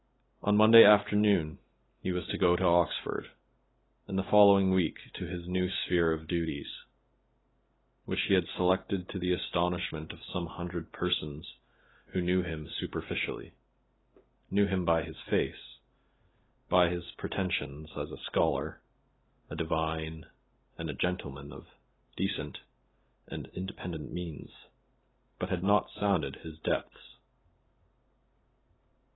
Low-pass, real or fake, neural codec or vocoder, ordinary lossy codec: 7.2 kHz; real; none; AAC, 16 kbps